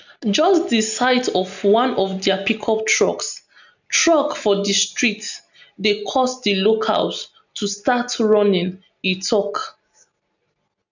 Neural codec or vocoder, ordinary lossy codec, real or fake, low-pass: none; none; real; 7.2 kHz